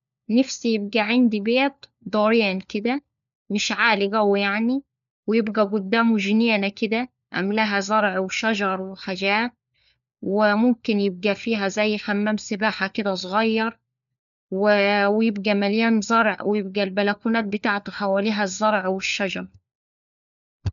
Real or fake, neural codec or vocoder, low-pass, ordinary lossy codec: fake; codec, 16 kHz, 4 kbps, FunCodec, trained on LibriTTS, 50 frames a second; 7.2 kHz; MP3, 96 kbps